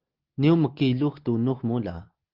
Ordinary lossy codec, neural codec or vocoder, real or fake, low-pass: Opus, 16 kbps; none; real; 5.4 kHz